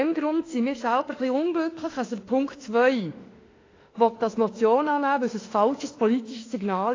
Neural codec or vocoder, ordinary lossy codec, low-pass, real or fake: autoencoder, 48 kHz, 32 numbers a frame, DAC-VAE, trained on Japanese speech; AAC, 32 kbps; 7.2 kHz; fake